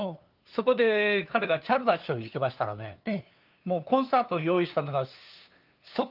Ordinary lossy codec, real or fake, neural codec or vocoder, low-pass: Opus, 24 kbps; fake; codec, 16 kHz, 2 kbps, FunCodec, trained on LibriTTS, 25 frames a second; 5.4 kHz